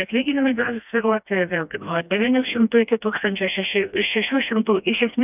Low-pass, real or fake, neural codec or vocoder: 3.6 kHz; fake; codec, 16 kHz, 1 kbps, FreqCodec, smaller model